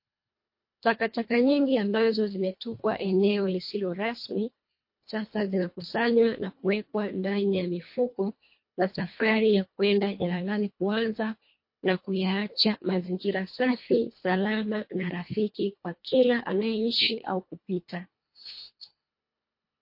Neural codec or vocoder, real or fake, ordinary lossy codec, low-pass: codec, 24 kHz, 1.5 kbps, HILCodec; fake; MP3, 32 kbps; 5.4 kHz